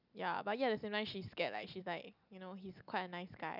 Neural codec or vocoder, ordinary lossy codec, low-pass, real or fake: none; none; 5.4 kHz; real